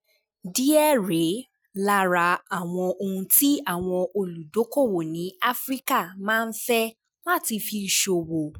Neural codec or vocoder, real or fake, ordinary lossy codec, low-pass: none; real; none; none